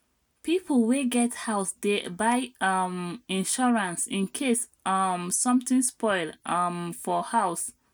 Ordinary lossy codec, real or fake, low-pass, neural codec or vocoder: none; real; none; none